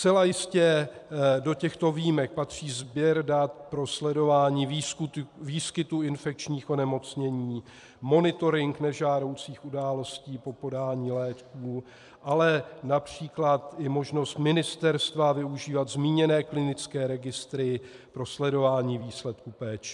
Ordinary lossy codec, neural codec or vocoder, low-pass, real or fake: MP3, 96 kbps; vocoder, 44.1 kHz, 128 mel bands every 256 samples, BigVGAN v2; 10.8 kHz; fake